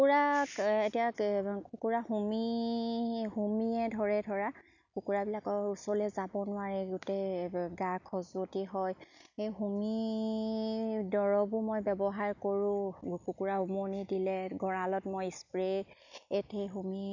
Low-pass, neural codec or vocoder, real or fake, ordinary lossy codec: 7.2 kHz; none; real; none